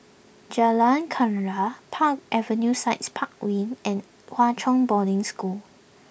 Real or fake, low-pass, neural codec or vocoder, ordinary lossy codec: real; none; none; none